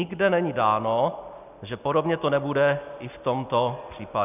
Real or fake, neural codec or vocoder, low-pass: real; none; 3.6 kHz